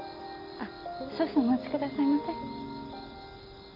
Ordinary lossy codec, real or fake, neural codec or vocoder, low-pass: none; real; none; 5.4 kHz